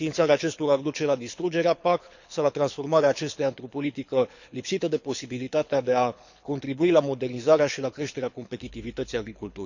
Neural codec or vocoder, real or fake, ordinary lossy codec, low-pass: codec, 24 kHz, 6 kbps, HILCodec; fake; none; 7.2 kHz